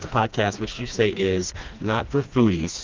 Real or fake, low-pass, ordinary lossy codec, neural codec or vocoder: fake; 7.2 kHz; Opus, 32 kbps; codec, 16 kHz, 2 kbps, FreqCodec, smaller model